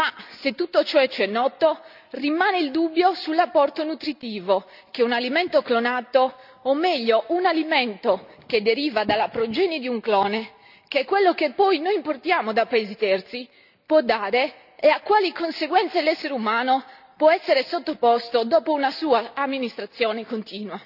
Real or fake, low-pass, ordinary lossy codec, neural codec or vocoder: real; 5.4 kHz; none; none